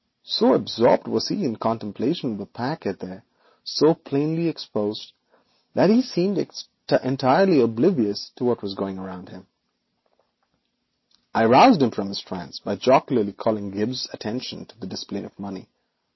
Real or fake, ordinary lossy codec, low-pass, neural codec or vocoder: real; MP3, 24 kbps; 7.2 kHz; none